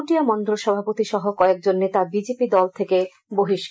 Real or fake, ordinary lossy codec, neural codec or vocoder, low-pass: real; none; none; none